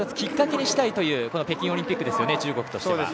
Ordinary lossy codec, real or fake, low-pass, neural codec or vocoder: none; real; none; none